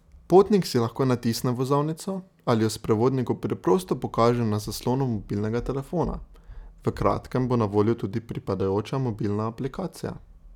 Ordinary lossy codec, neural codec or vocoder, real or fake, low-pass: none; none; real; 19.8 kHz